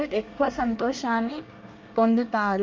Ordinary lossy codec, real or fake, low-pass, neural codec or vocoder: Opus, 32 kbps; fake; 7.2 kHz; codec, 24 kHz, 1 kbps, SNAC